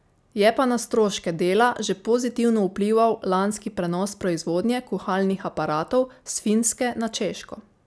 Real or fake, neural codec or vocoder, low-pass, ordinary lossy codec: real; none; none; none